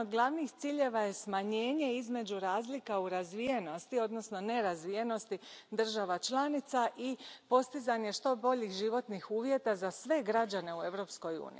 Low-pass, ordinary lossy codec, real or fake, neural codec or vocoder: none; none; real; none